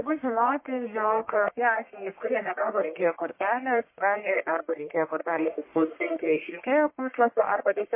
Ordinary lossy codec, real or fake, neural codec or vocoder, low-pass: MP3, 24 kbps; fake; codec, 44.1 kHz, 1.7 kbps, Pupu-Codec; 3.6 kHz